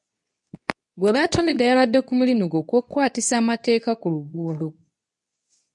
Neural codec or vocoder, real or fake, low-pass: codec, 24 kHz, 0.9 kbps, WavTokenizer, medium speech release version 2; fake; 10.8 kHz